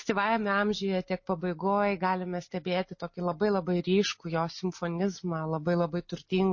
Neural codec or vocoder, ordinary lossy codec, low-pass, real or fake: none; MP3, 32 kbps; 7.2 kHz; real